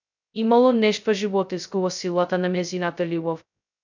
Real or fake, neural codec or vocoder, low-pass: fake; codec, 16 kHz, 0.2 kbps, FocalCodec; 7.2 kHz